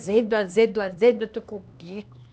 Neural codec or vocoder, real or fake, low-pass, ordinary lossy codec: codec, 16 kHz, 2 kbps, X-Codec, HuBERT features, trained on LibriSpeech; fake; none; none